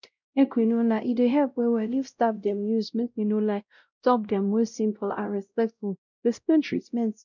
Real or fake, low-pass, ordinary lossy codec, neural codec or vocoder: fake; 7.2 kHz; none; codec, 16 kHz, 0.5 kbps, X-Codec, WavLM features, trained on Multilingual LibriSpeech